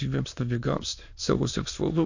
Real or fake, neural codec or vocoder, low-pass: fake; autoencoder, 22.05 kHz, a latent of 192 numbers a frame, VITS, trained on many speakers; 7.2 kHz